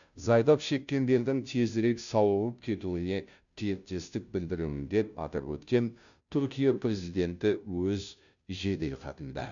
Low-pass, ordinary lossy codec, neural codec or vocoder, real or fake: 7.2 kHz; none; codec, 16 kHz, 0.5 kbps, FunCodec, trained on Chinese and English, 25 frames a second; fake